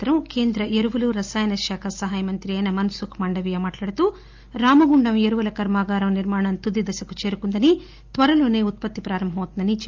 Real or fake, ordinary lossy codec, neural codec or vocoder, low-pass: real; Opus, 32 kbps; none; 7.2 kHz